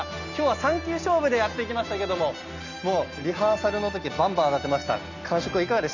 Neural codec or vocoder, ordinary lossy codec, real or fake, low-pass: none; none; real; 7.2 kHz